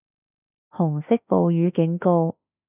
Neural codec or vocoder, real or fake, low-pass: autoencoder, 48 kHz, 32 numbers a frame, DAC-VAE, trained on Japanese speech; fake; 3.6 kHz